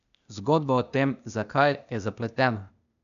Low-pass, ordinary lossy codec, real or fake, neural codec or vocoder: 7.2 kHz; none; fake; codec, 16 kHz, 0.8 kbps, ZipCodec